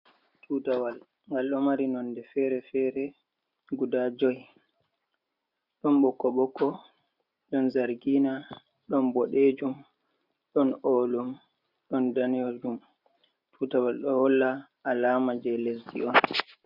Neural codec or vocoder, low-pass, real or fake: none; 5.4 kHz; real